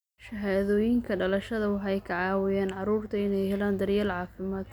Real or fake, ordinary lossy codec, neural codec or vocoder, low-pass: real; none; none; none